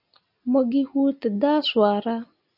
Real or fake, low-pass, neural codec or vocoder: real; 5.4 kHz; none